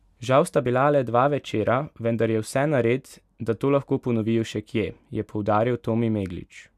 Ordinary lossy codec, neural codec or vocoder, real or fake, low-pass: none; none; real; 14.4 kHz